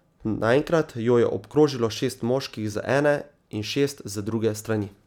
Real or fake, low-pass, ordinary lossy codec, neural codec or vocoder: real; 19.8 kHz; none; none